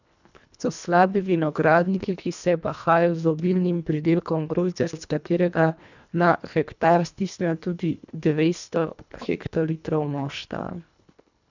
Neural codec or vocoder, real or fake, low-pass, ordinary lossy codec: codec, 24 kHz, 1.5 kbps, HILCodec; fake; 7.2 kHz; none